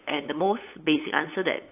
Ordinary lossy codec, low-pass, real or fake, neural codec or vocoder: AAC, 32 kbps; 3.6 kHz; fake; codec, 16 kHz, 8 kbps, FunCodec, trained on LibriTTS, 25 frames a second